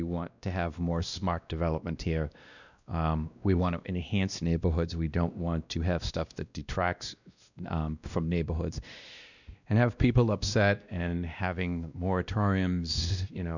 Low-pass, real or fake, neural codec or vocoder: 7.2 kHz; fake; codec, 16 kHz, 1 kbps, X-Codec, WavLM features, trained on Multilingual LibriSpeech